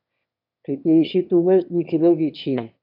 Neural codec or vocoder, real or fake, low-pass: autoencoder, 22.05 kHz, a latent of 192 numbers a frame, VITS, trained on one speaker; fake; 5.4 kHz